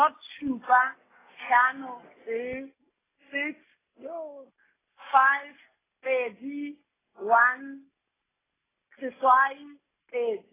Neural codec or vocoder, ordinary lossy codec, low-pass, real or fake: none; AAC, 16 kbps; 3.6 kHz; real